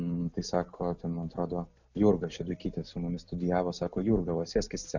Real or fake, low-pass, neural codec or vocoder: real; 7.2 kHz; none